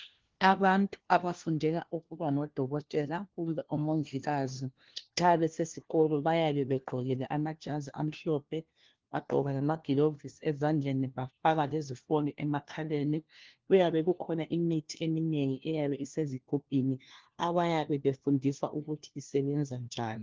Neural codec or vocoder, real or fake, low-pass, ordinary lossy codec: codec, 16 kHz, 1 kbps, FunCodec, trained on LibriTTS, 50 frames a second; fake; 7.2 kHz; Opus, 16 kbps